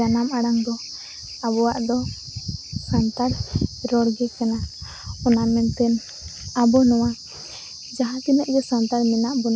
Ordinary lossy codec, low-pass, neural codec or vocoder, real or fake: none; none; none; real